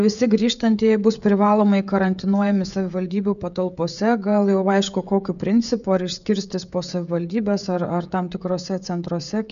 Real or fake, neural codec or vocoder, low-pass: fake; codec, 16 kHz, 16 kbps, FreqCodec, smaller model; 7.2 kHz